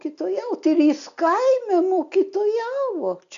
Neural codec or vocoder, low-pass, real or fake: none; 7.2 kHz; real